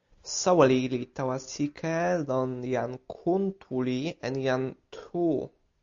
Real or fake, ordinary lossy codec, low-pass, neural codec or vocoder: real; AAC, 64 kbps; 7.2 kHz; none